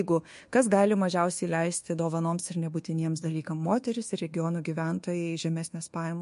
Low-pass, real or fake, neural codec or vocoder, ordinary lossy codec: 10.8 kHz; fake; codec, 24 kHz, 1.2 kbps, DualCodec; MP3, 48 kbps